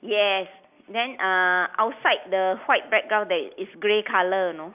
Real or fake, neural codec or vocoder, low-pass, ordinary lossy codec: real; none; 3.6 kHz; none